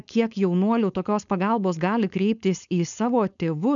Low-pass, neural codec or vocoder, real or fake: 7.2 kHz; codec, 16 kHz, 4.8 kbps, FACodec; fake